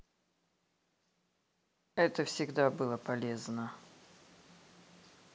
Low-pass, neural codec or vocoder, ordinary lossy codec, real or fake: none; none; none; real